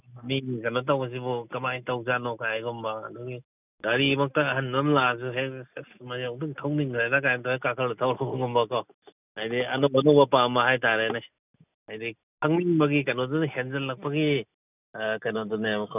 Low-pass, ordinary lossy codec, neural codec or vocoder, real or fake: 3.6 kHz; none; none; real